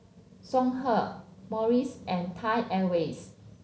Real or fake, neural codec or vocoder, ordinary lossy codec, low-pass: real; none; none; none